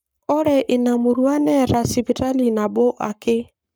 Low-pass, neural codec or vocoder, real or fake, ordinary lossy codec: none; codec, 44.1 kHz, 7.8 kbps, Pupu-Codec; fake; none